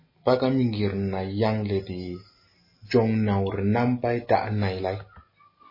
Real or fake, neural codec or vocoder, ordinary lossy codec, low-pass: real; none; MP3, 24 kbps; 5.4 kHz